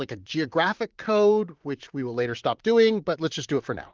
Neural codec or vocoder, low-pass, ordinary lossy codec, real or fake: vocoder, 44.1 kHz, 128 mel bands, Pupu-Vocoder; 7.2 kHz; Opus, 32 kbps; fake